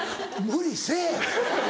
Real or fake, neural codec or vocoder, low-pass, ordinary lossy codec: real; none; none; none